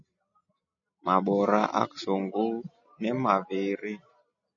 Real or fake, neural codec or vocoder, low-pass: real; none; 7.2 kHz